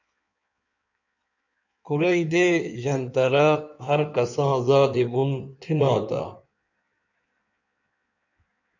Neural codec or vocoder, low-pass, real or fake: codec, 16 kHz in and 24 kHz out, 1.1 kbps, FireRedTTS-2 codec; 7.2 kHz; fake